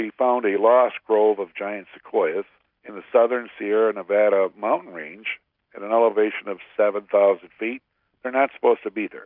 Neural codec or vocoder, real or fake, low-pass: none; real; 5.4 kHz